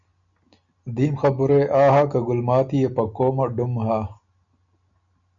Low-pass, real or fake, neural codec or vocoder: 7.2 kHz; real; none